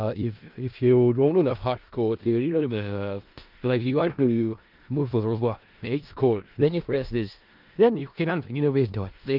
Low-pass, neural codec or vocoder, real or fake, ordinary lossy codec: 5.4 kHz; codec, 16 kHz in and 24 kHz out, 0.4 kbps, LongCat-Audio-Codec, four codebook decoder; fake; Opus, 32 kbps